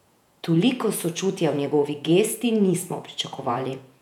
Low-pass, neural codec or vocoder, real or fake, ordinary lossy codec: 19.8 kHz; vocoder, 48 kHz, 128 mel bands, Vocos; fake; none